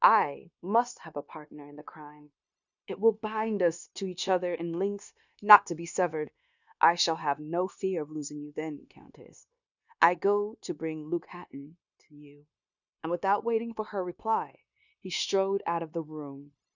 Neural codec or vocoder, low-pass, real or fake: codec, 16 kHz, 0.9 kbps, LongCat-Audio-Codec; 7.2 kHz; fake